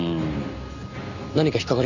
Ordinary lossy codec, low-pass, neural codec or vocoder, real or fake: none; 7.2 kHz; none; real